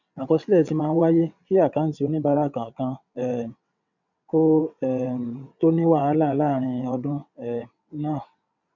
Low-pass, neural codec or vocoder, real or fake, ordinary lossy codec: 7.2 kHz; vocoder, 22.05 kHz, 80 mel bands, WaveNeXt; fake; none